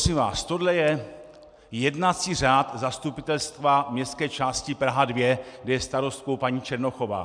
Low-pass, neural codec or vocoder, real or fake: 9.9 kHz; none; real